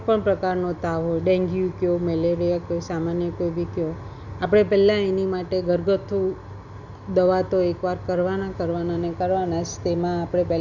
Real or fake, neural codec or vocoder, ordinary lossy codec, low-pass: real; none; none; 7.2 kHz